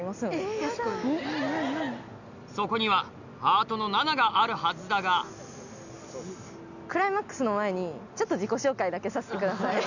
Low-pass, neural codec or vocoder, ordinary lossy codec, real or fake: 7.2 kHz; none; none; real